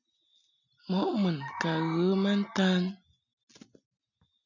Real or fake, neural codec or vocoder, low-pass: real; none; 7.2 kHz